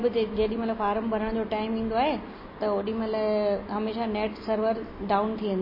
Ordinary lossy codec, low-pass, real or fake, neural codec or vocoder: MP3, 24 kbps; 5.4 kHz; real; none